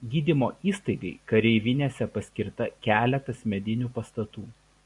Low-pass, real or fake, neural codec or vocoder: 10.8 kHz; real; none